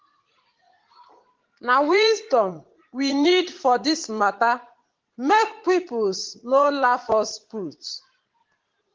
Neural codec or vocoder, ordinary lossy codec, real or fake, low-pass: vocoder, 44.1 kHz, 80 mel bands, Vocos; Opus, 16 kbps; fake; 7.2 kHz